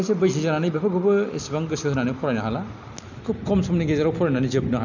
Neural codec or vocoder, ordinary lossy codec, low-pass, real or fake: none; none; 7.2 kHz; real